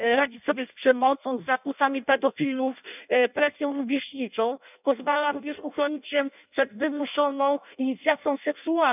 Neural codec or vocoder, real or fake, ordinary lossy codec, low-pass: codec, 16 kHz in and 24 kHz out, 0.6 kbps, FireRedTTS-2 codec; fake; none; 3.6 kHz